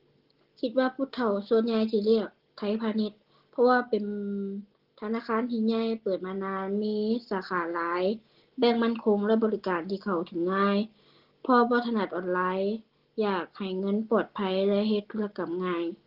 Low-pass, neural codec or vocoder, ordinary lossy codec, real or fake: 5.4 kHz; none; Opus, 16 kbps; real